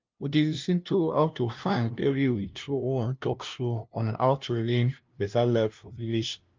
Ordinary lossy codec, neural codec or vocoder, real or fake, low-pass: Opus, 24 kbps; codec, 16 kHz, 0.5 kbps, FunCodec, trained on LibriTTS, 25 frames a second; fake; 7.2 kHz